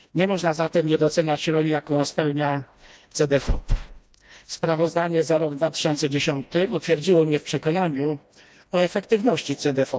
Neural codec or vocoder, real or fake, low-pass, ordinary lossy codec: codec, 16 kHz, 1 kbps, FreqCodec, smaller model; fake; none; none